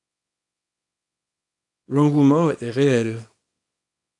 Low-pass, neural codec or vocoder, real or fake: 10.8 kHz; codec, 24 kHz, 0.9 kbps, WavTokenizer, small release; fake